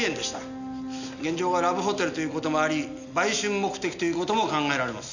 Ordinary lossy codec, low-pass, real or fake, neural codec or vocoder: none; 7.2 kHz; real; none